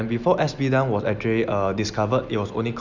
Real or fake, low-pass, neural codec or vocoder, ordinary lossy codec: real; 7.2 kHz; none; none